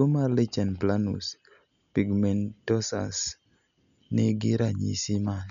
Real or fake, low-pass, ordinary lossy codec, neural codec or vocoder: real; 7.2 kHz; none; none